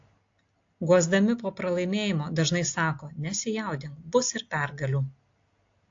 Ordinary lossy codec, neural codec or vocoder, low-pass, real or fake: AAC, 48 kbps; none; 7.2 kHz; real